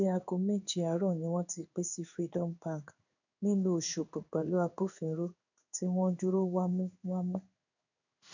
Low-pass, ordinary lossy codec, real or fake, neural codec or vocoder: 7.2 kHz; none; fake; codec, 16 kHz in and 24 kHz out, 1 kbps, XY-Tokenizer